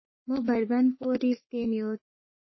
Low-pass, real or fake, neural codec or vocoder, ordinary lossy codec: 7.2 kHz; fake; codec, 44.1 kHz, 3.4 kbps, Pupu-Codec; MP3, 24 kbps